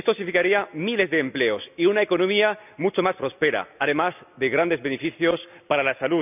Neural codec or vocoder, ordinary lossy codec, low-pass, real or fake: none; none; 3.6 kHz; real